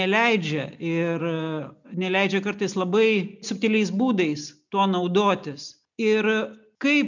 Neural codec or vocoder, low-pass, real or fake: none; 7.2 kHz; real